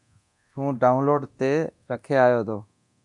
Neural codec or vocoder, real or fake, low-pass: codec, 24 kHz, 0.9 kbps, DualCodec; fake; 10.8 kHz